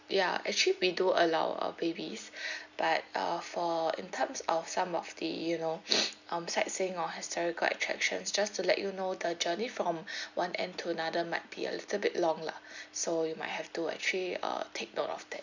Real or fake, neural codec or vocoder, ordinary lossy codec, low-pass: real; none; none; 7.2 kHz